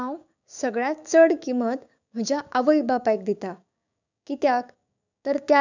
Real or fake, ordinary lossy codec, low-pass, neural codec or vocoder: fake; none; 7.2 kHz; codec, 16 kHz, 6 kbps, DAC